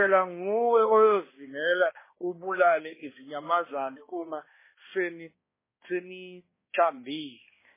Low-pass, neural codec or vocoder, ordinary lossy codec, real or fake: 3.6 kHz; codec, 16 kHz, 1 kbps, X-Codec, HuBERT features, trained on balanced general audio; MP3, 16 kbps; fake